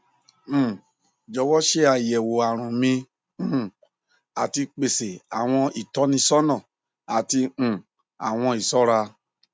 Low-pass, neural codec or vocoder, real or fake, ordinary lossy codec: none; none; real; none